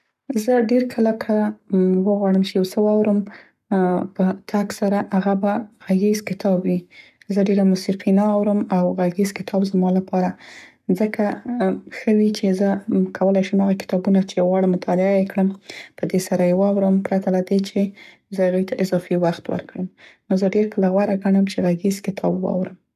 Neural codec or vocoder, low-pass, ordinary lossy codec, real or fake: codec, 44.1 kHz, 7.8 kbps, Pupu-Codec; 14.4 kHz; none; fake